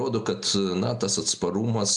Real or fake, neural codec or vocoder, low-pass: real; none; 10.8 kHz